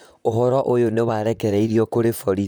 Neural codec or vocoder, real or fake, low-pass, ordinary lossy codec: vocoder, 44.1 kHz, 128 mel bands, Pupu-Vocoder; fake; none; none